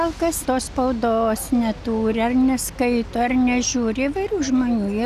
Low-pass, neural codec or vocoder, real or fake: 14.4 kHz; none; real